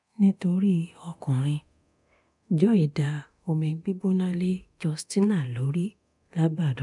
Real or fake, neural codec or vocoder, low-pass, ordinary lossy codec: fake; codec, 24 kHz, 0.9 kbps, DualCodec; 10.8 kHz; none